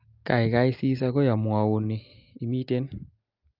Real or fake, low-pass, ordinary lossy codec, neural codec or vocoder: real; 5.4 kHz; Opus, 32 kbps; none